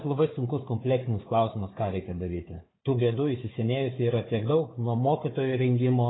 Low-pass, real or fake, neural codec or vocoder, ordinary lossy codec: 7.2 kHz; fake; codec, 16 kHz, 4 kbps, FunCodec, trained on Chinese and English, 50 frames a second; AAC, 16 kbps